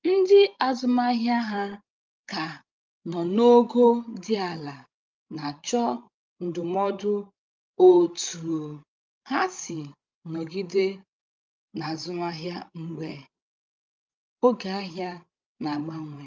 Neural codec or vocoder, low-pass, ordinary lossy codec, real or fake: codec, 16 kHz, 16 kbps, FreqCodec, larger model; 7.2 kHz; Opus, 24 kbps; fake